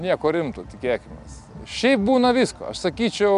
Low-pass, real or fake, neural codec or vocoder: 10.8 kHz; real; none